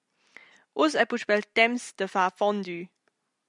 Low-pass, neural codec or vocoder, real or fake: 10.8 kHz; none; real